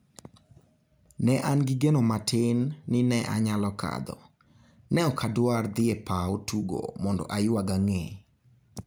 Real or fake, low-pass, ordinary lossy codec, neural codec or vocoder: real; none; none; none